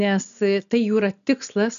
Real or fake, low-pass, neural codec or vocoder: real; 7.2 kHz; none